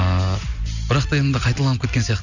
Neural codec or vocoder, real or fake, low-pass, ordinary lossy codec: none; real; 7.2 kHz; none